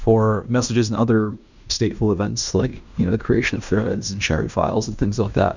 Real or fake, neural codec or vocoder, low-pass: fake; codec, 16 kHz in and 24 kHz out, 0.9 kbps, LongCat-Audio-Codec, fine tuned four codebook decoder; 7.2 kHz